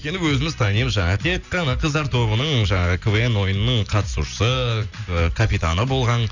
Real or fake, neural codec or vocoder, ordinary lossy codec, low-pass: real; none; none; 7.2 kHz